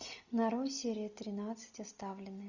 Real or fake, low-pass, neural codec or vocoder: real; 7.2 kHz; none